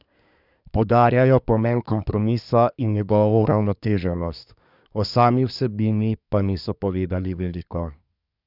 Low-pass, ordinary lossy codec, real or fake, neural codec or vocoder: 5.4 kHz; none; fake; codec, 24 kHz, 1 kbps, SNAC